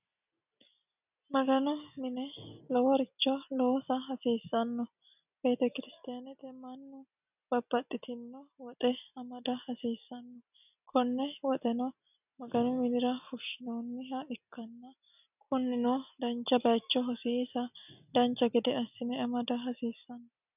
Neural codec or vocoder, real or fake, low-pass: none; real; 3.6 kHz